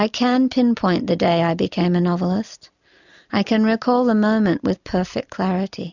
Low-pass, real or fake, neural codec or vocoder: 7.2 kHz; real; none